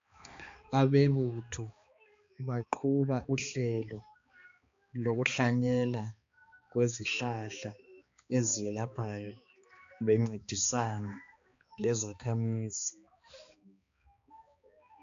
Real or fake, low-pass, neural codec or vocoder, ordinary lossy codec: fake; 7.2 kHz; codec, 16 kHz, 2 kbps, X-Codec, HuBERT features, trained on balanced general audio; AAC, 64 kbps